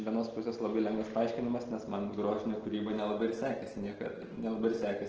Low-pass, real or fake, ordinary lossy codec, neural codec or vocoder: 7.2 kHz; real; Opus, 16 kbps; none